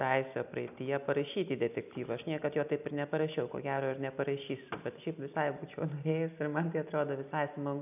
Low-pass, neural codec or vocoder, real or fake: 3.6 kHz; none; real